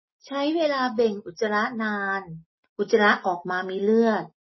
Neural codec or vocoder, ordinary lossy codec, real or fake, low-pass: none; MP3, 24 kbps; real; 7.2 kHz